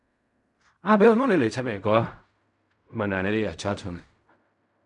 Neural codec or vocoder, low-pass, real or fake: codec, 16 kHz in and 24 kHz out, 0.4 kbps, LongCat-Audio-Codec, fine tuned four codebook decoder; 10.8 kHz; fake